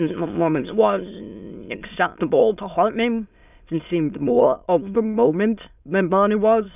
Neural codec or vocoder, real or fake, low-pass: autoencoder, 22.05 kHz, a latent of 192 numbers a frame, VITS, trained on many speakers; fake; 3.6 kHz